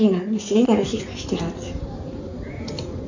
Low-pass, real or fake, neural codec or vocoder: 7.2 kHz; fake; codec, 16 kHz in and 24 kHz out, 2.2 kbps, FireRedTTS-2 codec